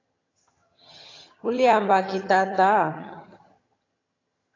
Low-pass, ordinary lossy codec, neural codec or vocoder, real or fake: 7.2 kHz; AAC, 32 kbps; vocoder, 22.05 kHz, 80 mel bands, HiFi-GAN; fake